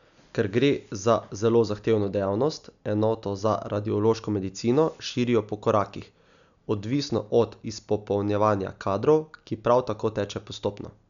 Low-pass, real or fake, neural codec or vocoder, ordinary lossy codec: 7.2 kHz; real; none; none